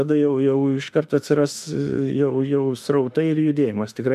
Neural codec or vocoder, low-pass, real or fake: autoencoder, 48 kHz, 32 numbers a frame, DAC-VAE, trained on Japanese speech; 14.4 kHz; fake